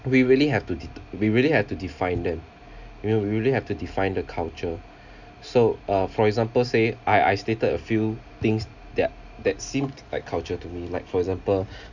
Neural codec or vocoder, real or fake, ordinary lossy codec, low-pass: none; real; none; 7.2 kHz